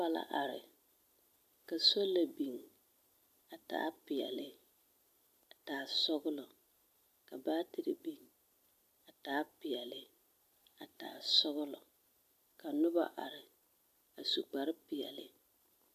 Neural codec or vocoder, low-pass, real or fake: none; 14.4 kHz; real